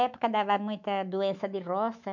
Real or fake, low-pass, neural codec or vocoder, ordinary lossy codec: fake; 7.2 kHz; autoencoder, 48 kHz, 128 numbers a frame, DAC-VAE, trained on Japanese speech; none